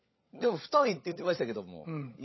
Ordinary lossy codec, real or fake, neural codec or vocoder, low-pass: MP3, 24 kbps; fake; codec, 16 kHz, 16 kbps, FunCodec, trained on LibriTTS, 50 frames a second; 7.2 kHz